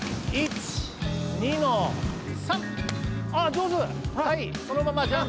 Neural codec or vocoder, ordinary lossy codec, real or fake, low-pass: none; none; real; none